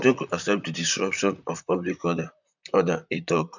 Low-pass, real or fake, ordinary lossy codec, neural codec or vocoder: 7.2 kHz; fake; none; vocoder, 44.1 kHz, 128 mel bands, Pupu-Vocoder